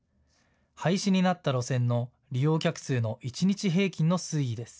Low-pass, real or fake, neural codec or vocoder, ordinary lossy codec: none; real; none; none